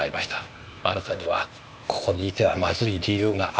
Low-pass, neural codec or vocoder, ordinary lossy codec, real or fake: none; codec, 16 kHz, 0.8 kbps, ZipCodec; none; fake